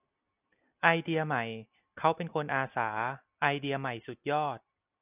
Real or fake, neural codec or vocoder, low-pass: real; none; 3.6 kHz